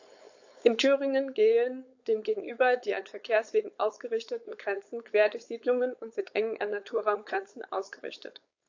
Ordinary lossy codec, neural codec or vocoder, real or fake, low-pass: AAC, 48 kbps; codec, 16 kHz, 4.8 kbps, FACodec; fake; 7.2 kHz